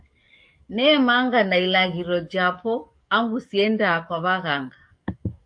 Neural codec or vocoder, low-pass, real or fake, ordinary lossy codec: codec, 44.1 kHz, 7.8 kbps, DAC; 9.9 kHz; fake; AAC, 64 kbps